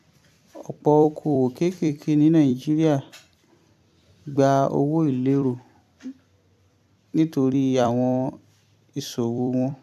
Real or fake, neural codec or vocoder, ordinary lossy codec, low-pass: fake; vocoder, 44.1 kHz, 128 mel bands every 256 samples, BigVGAN v2; AAC, 96 kbps; 14.4 kHz